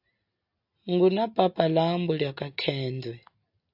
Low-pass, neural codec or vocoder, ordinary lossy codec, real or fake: 5.4 kHz; none; AAC, 48 kbps; real